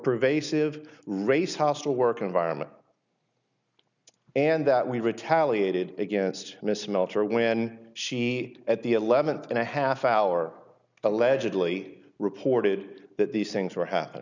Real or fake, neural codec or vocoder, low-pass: real; none; 7.2 kHz